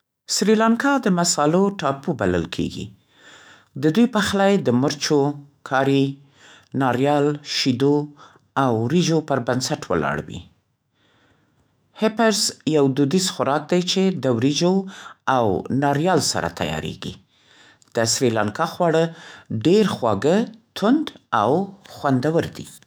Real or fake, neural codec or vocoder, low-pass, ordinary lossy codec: fake; autoencoder, 48 kHz, 128 numbers a frame, DAC-VAE, trained on Japanese speech; none; none